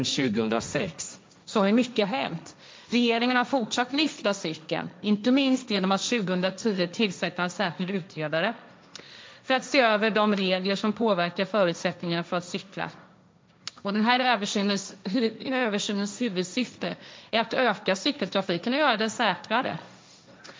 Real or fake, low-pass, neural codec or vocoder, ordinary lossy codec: fake; none; codec, 16 kHz, 1.1 kbps, Voila-Tokenizer; none